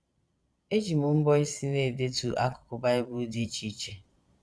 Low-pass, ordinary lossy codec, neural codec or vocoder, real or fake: none; none; vocoder, 22.05 kHz, 80 mel bands, Vocos; fake